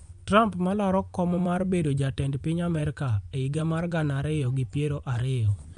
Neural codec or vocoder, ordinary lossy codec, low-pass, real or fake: vocoder, 24 kHz, 100 mel bands, Vocos; none; 10.8 kHz; fake